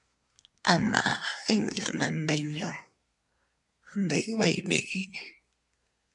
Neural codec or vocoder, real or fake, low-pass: codec, 24 kHz, 1 kbps, SNAC; fake; 10.8 kHz